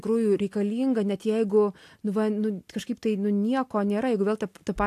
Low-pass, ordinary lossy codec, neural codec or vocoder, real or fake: 14.4 kHz; AAC, 64 kbps; none; real